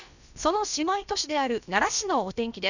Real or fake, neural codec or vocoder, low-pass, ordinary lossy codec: fake; codec, 16 kHz, about 1 kbps, DyCAST, with the encoder's durations; 7.2 kHz; none